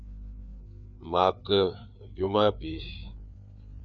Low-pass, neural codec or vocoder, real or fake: 7.2 kHz; codec, 16 kHz, 4 kbps, FreqCodec, larger model; fake